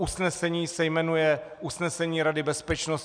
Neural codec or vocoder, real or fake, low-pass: none; real; 9.9 kHz